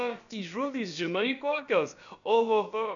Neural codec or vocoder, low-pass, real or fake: codec, 16 kHz, about 1 kbps, DyCAST, with the encoder's durations; 7.2 kHz; fake